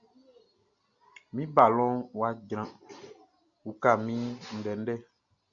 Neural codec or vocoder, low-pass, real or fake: none; 7.2 kHz; real